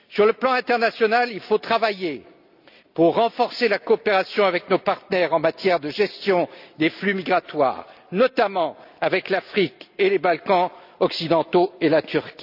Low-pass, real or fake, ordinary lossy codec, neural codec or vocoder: 5.4 kHz; real; none; none